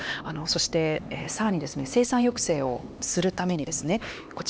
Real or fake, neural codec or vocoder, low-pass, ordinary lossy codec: fake; codec, 16 kHz, 2 kbps, X-Codec, HuBERT features, trained on LibriSpeech; none; none